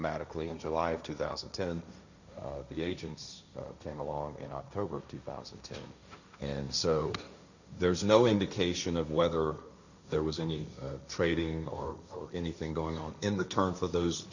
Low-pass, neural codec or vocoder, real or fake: 7.2 kHz; codec, 16 kHz, 1.1 kbps, Voila-Tokenizer; fake